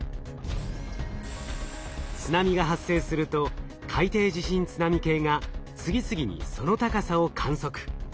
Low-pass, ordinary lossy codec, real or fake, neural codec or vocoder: none; none; real; none